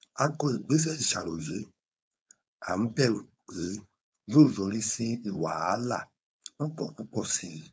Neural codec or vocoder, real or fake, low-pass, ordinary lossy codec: codec, 16 kHz, 4.8 kbps, FACodec; fake; none; none